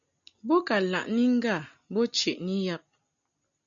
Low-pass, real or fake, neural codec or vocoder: 7.2 kHz; real; none